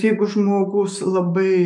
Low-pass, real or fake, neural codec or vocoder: 10.8 kHz; fake; autoencoder, 48 kHz, 128 numbers a frame, DAC-VAE, trained on Japanese speech